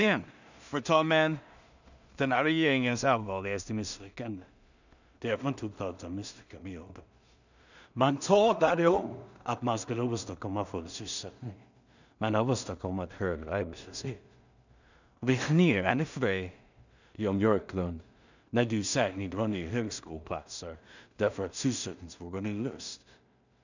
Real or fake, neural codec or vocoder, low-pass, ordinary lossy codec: fake; codec, 16 kHz in and 24 kHz out, 0.4 kbps, LongCat-Audio-Codec, two codebook decoder; 7.2 kHz; none